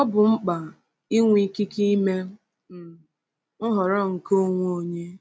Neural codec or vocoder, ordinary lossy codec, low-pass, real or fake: none; none; none; real